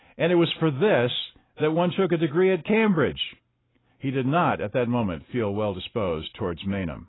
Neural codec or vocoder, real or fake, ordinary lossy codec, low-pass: none; real; AAC, 16 kbps; 7.2 kHz